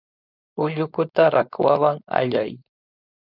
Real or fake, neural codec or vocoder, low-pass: fake; codec, 16 kHz, 4.8 kbps, FACodec; 5.4 kHz